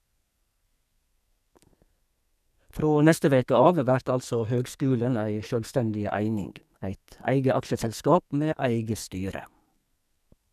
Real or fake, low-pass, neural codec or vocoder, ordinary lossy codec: fake; 14.4 kHz; codec, 44.1 kHz, 2.6 kbps, SNAC; none